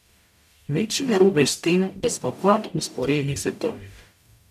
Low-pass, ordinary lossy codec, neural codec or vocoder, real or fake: 14.4 kHz; none; codec, 44.1 kHz, 0.9 kbps, DAC; fake